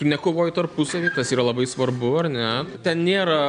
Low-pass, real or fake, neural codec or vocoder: 9.9 kHz; real; none